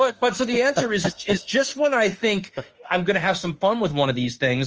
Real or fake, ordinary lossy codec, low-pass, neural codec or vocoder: fake; Opus, 24 kbps; 7.2 kHz; autoencoder, 48 kHz, 32 numbers a frame, DAC-VAE, trained on Japanese speech